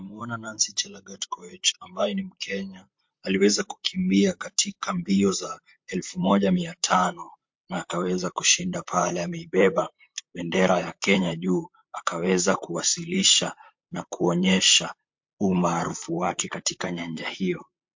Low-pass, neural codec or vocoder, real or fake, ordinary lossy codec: 7.2 kHz; vocoder, 44.1 kHz, 128 mel bands, Pupu-Vocoder; fake; MP3, 48 kbps